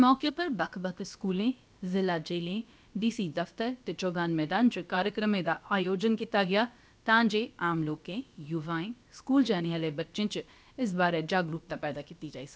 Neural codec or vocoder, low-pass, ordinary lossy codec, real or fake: codec, 16 kHz, about 1 kbps, DyCAST, with the encoder's durations; none; none; fake